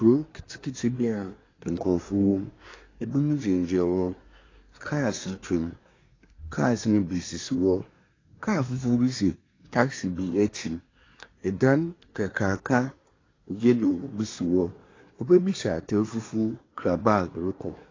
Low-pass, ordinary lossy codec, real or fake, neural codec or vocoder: 7.2 kHz; AAC, 32 kbps; fake; codec, 24 kHz, 1 kbps, SNAC